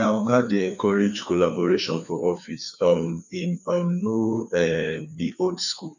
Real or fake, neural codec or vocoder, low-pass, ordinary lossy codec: fake; codec, 16 kHz, 2 kbps, FreqCodec, larger model; 7.2 kHz; none